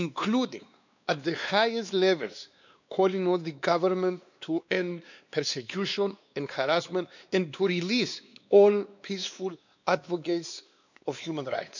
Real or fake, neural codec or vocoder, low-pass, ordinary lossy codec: fake; codec, 16 kHz, 2 kbps, X-Codec, WavLM features, trained on Multilingual LibriSpeech; 7.2 kHz; none